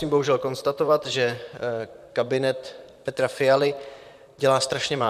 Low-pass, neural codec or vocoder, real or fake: 14.4 kHz; vocoder, 44.1 kHz, 128 mel bands, Pupu-Vocoder; fake